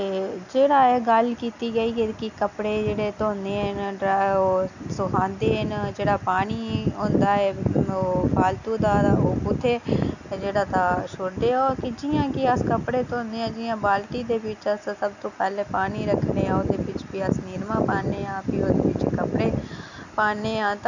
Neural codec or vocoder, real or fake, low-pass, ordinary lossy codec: none; real; 7.2 kHz; none